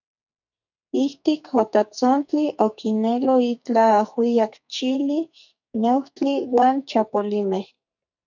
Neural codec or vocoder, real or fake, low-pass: codec, 44.1 kHz, 2.6 kbps, SNAC; fake; 7.2 kHz